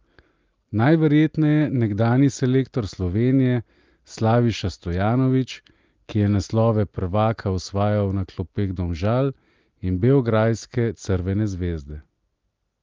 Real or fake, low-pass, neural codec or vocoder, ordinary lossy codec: real; 7.2 kHz; none; Opus, 32 kbps